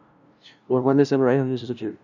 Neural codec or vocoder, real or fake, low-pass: codec, 16 kHz, 0.5 kbps, FunCodec, trained on LibriTTS, 25 frames a second; fake; 7.2 kHz